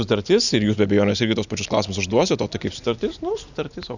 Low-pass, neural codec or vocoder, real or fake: 7.2 kHz; none; real